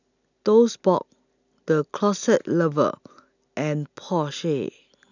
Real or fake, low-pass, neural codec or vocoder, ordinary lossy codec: real; 7.2 kHz; none; none